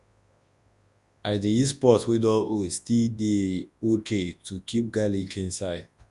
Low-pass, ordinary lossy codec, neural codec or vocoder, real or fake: 10.8 kHz; Opus, 64 kbps; codec, 24 kHz, 0.9 kbps, WavTokenizer, large speech release; fake